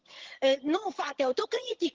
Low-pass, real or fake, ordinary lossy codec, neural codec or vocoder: 7.2 kHz; fake; Opus, 16 kbps; vocoder, 22.05 kHz, 80 mel bands, HiFi-GAN